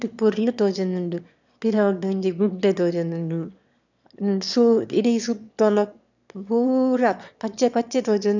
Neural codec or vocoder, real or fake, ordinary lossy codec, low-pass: autoencoder, 22.05 kHz, a latent of 192 numbers a frame, VITS, trained on one speaker; fake; none; 7.2 kHz